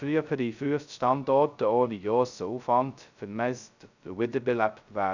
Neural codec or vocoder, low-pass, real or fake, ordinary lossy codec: codec, 16 kHz, 0.2 kbps, FocalCodec; 7.2 kHz; fake; none